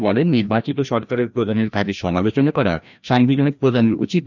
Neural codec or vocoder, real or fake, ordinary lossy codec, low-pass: codec, 16 kHz, 1 kbps, FreqCodec, larger model; fake; none; 7.2 kHz